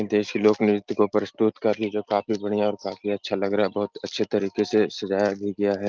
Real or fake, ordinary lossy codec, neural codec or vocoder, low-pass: real; Opus, 24 kbps; none; 7.2 kHz